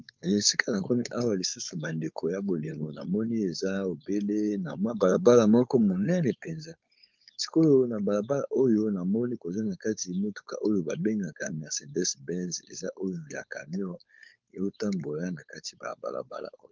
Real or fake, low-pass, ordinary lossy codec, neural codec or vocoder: fake; 7.2 kHz; Opus, 24 kbps; codec, 16 kHz, 4.8 kbps, FACodec